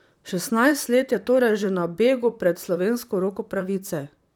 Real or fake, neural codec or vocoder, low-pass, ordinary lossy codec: fake; vocoder, 44.1 kHz, 128 mel bands, Pupu-Vocoder; 19.8 kHz; none